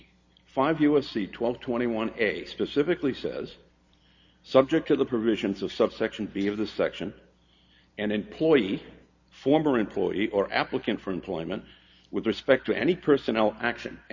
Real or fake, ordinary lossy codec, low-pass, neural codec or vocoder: real; Opus, 64 kbps; 7.2 kHz; none